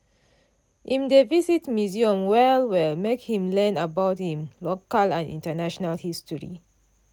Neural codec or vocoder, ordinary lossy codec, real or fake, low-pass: none; none; real; 19.8 kHz